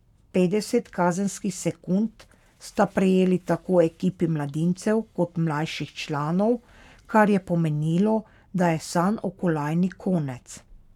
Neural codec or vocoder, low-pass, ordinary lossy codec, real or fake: codec, 44.1 kHz, 7.8 kbps, Pupu-Codec; 19.8 kHz; none; fake